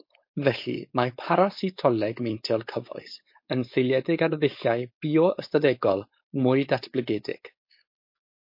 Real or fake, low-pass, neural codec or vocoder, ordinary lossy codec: fake; 5.4 kHz; codec, 16 kHz, 4.8 kbps, FACodec; MP3, 32 kbps